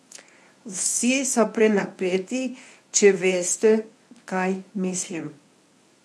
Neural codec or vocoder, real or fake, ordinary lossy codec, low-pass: codec, 24 kHz, 0.9 kbps, WavTokenizer, medium speech release version 1; fake; none; none